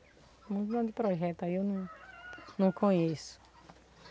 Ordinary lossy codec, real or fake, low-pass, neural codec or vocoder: none; real; none; none